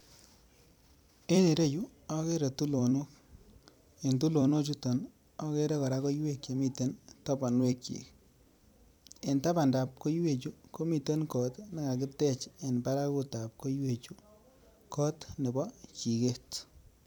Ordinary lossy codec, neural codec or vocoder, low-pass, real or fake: none; vocoder, 44.1 kHz, 128 mel bands every 256 samples, BigVGAN v2; none; fake